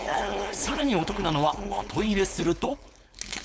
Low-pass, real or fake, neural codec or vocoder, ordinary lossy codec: none; fake; codec, 16 kHz, 4.8 kbps, FACodec; none